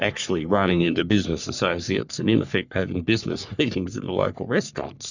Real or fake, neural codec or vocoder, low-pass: fake; codec, 44.1 kHz, 3.4 kbps, Pupu-Codec; 7.2 kHz